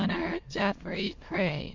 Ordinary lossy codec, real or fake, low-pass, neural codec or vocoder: MP3, 48 kbps; fake; 7.2 kHz; autoencoder, 22.05 kHz, a latent of 192 numbers a frame, VITS, trained on many speakers